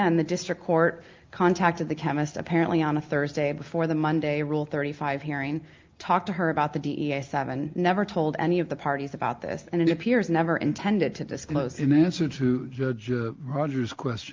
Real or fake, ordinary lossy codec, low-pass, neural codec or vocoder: real; Opus, 32 kbps; 7.2 kHz; none